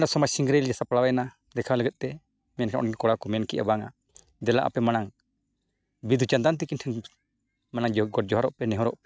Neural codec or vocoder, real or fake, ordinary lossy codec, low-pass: none; real; none; none